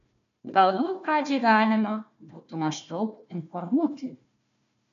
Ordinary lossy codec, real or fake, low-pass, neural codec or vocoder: AAC, 96 kbps; fake; 7.2 kHz; codec, 16 kHz, 1 kbps, FunCodec, trained on Chinese and English, 50 frames a second